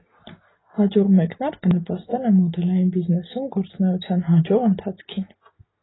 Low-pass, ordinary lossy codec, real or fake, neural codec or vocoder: 7.2 kHz; AAC, 16 kbps; real; none